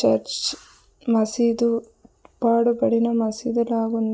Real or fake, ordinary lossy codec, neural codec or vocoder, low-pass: real; none; none; none